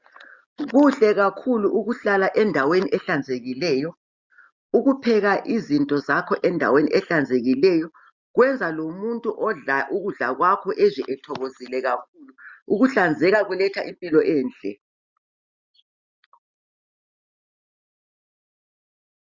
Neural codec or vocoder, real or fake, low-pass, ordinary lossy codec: none; real; 7.2 kHz; Opus, 64 kbps